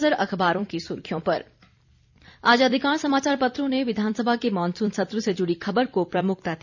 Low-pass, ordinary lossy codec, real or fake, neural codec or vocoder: 7.2 kHz; none; fake; vocoder, 44.1 kHz, 128 mel bands every 256 samples, BigVGAN v2